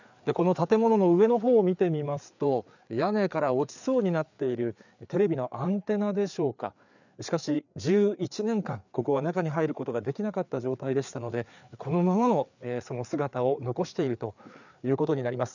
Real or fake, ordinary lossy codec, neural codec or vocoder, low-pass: fake; none; codec, 16 kHz, 4 kbps, FreqCodec, larger model; 7.2 kHz